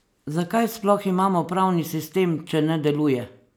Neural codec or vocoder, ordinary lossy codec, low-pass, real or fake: none; none; none; real